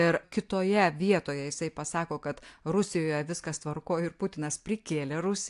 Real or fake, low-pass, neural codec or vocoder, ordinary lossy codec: real; 10.8 kHz; none; AAC, 64 kbps